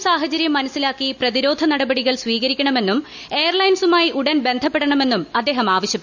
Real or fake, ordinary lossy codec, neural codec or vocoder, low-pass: real; none; none; 7.2 kHz